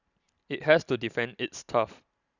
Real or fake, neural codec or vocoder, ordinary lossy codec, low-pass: real; none; none; 7.2 kHz